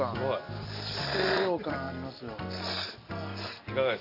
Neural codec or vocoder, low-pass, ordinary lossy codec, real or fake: none; 5.4 kHz; none; real